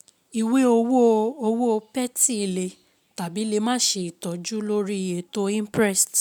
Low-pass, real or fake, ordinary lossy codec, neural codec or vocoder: none; real; none; none